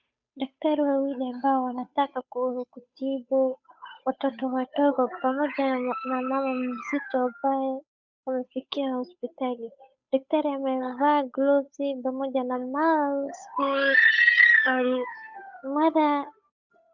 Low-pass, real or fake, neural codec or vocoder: 7.2 kHz; fake; codec, 16 kHz, 8 kbps, FunCodec, trained on Chinese and English, 25 frames a second